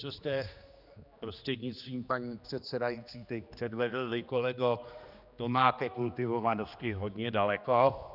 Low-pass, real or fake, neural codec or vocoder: 5.4 kHz; fake; codec, 16 kHz, 2 kbps, X-Codec, HuBERT features, trained on general audio